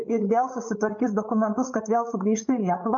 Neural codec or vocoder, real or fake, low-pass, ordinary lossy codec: codec, 16 kHz, 8 kbps, FreqCodec, larger model; fake; 7.2 kHz; MP3, 64 kbps